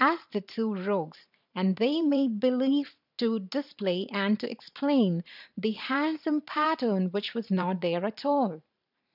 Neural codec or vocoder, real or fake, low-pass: vocoder, 44.1 kHz, 128 mel bands every 512 samples, BigVGAN v2; fake; 5.4 kHz